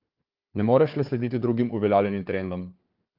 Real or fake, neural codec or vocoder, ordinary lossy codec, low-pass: fake; codec, 16 kHz, 4 kbps, FunCodec, trained on Chinese and English, 50 frames a second; Opus, 32 kbps; 5.4 kHz